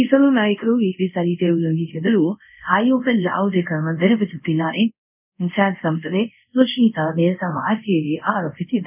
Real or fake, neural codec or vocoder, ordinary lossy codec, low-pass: fake; codec, 24 kHz, 0.5 kbps, DualCodec; none; 3.6 kHz